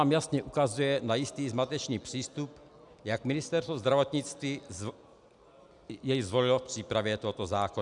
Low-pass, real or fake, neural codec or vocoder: 10.8 kHz; real; none